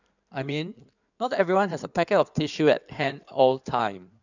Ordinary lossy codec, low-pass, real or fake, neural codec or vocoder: none; 7.2 kHz; fake; codec, 16 kHz in and 24 kHz out, 2.2 kbps, FireRedTTS-2 codec